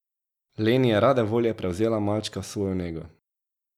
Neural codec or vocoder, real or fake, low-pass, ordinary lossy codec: none; real; 19.8 kHz; none